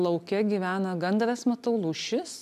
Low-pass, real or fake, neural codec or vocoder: 14.4 kHz; real; none